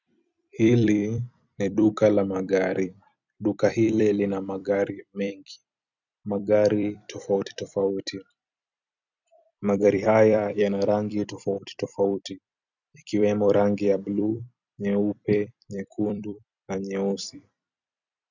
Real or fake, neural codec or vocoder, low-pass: fake; vocoder, 44.1 kHz, 128 mel bands every 256 samples, BigVGAN v2; 7.2 kHz